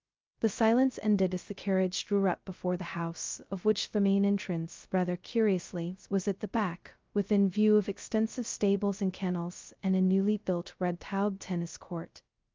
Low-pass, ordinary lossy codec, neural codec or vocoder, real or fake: 7.2 kHz; Opus, 24 kbps; codec, 16 kHz, 0.2 kbps, FocalCodec; fake